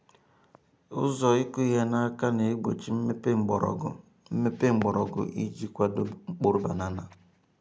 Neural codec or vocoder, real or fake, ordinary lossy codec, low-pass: none; real; none; none